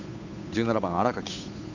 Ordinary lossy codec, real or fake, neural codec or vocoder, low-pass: none; fake; codec, 16 kHz, 8 kbps, FunCodec, trained on Chinese and English, 25 frames a second; 7.2 kHz